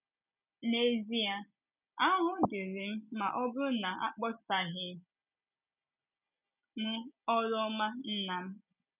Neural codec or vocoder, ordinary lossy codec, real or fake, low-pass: none; none; real; 3.6 kHz